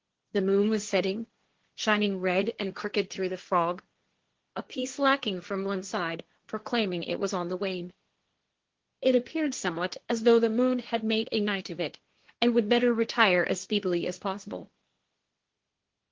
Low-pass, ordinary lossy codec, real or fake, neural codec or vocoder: 7.2 kHz; Opus, 16 kbps; fake; codec, 16 kHz, 1.1 kbps, Voila-Tokenizer